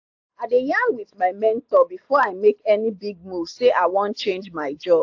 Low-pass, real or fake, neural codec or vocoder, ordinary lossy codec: 7.2 kHz; real; none; AAC, 48 kbps